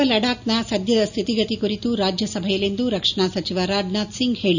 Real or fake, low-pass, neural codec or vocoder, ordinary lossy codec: real; 7.2 kHz; none; none